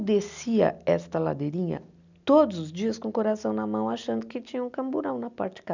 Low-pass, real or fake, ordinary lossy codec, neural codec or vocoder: 7.2 kHz; real; none; none